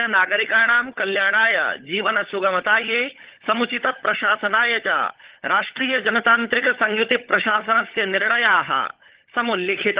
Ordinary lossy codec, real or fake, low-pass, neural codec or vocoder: Opus, 16 kbps; fake; 3.6 kHz; codec, 16 kHz, 16 kbps, FunCodec, trained on LibriTTS, 50 frames a second